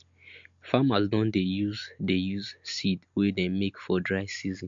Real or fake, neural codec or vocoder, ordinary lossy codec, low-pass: real; none; MP3, 48 kbps; 7.2 kHz